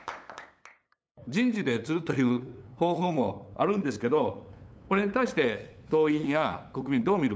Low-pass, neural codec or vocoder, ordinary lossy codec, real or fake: none; codec, 16 kHz, 8 kbps, FunCodec, trained on LibriTTS, 25 frames a second; none; fake